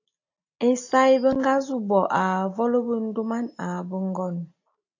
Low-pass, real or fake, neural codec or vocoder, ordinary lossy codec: 7.2 kHz; real; none; AAC, 48 kbps